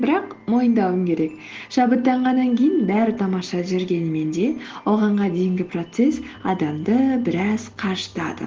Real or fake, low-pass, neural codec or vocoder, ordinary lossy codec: real; 7.2 kHz; none; Opus, 16 kbps